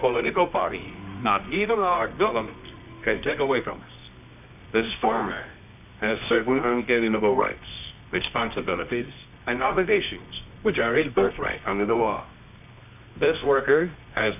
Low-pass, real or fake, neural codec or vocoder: 3.6 kHz; fake; codec, 24 kHz, 0.9 kbps, WavTokenizer, medium music audio release